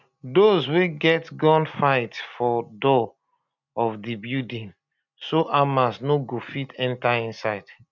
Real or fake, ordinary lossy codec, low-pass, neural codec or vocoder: real; none; 7.2 kHz; none